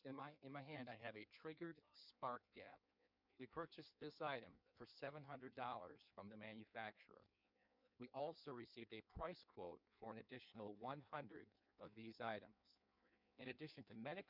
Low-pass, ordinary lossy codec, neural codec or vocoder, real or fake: 5.4 kHz; MP3, 48 kbps; codec, 16 kHz in and 24 kHz out, 1.1 kbps, FireRedTTS-2 codec; fake